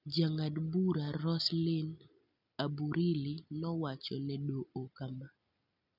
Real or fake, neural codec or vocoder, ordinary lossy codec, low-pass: real; none; none; 5.4 kHz